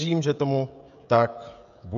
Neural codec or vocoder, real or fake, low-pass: codec, 16 kHz, 16 kbps, FreqCodec, smaller model; fake; 7.2 kHz